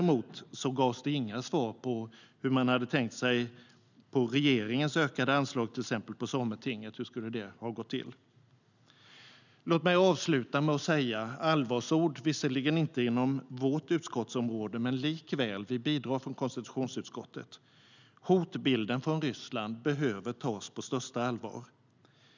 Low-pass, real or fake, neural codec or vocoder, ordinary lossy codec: 7.2 kHz; real; none; none